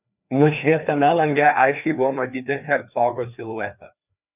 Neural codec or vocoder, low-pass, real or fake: codec, 16 kHz, 2 kbps, FreqCodec, larger model; 3.6 kHz; fake